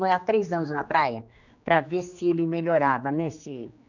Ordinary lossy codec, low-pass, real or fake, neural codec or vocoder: none; 7.2 kHz; fake; codec, 16 kHz, 2 kbps, X-Codec, HuBERT features, trained on general audio